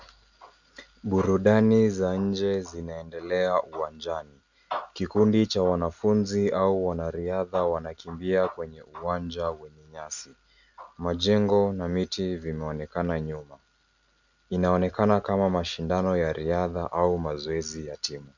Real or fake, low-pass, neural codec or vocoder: real; 7.2 kHz; none